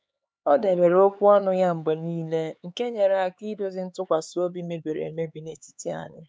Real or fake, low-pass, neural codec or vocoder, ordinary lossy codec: fake; none; codec, 16 kHz, 4 kbps, X-Codec, HuBERT features, trained on LibriSpeech; none